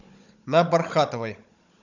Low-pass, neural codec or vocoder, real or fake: 7.2 kHz; codec, 16 kHz, 16 kbps, FunCodec, trained on Chinese and English, 50 frames a second; fake